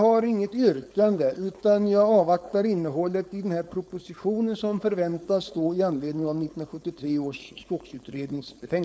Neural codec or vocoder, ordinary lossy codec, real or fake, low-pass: codec, 16 kHz, 4.8 kbps, FACodec; none; fake; none